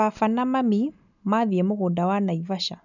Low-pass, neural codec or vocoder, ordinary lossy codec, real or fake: 7.2 kHz; none; none; real